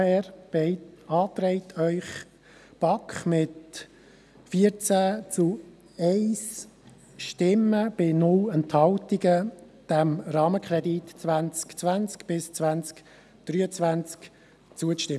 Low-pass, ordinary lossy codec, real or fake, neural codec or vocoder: none; none; real; none